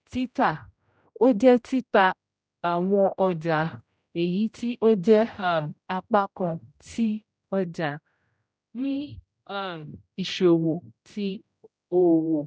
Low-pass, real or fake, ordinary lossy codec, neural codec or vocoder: none; fake; none; codec, 16 kHz, 0.5 kbps, X-Codec, HuBERT features, trained on general audio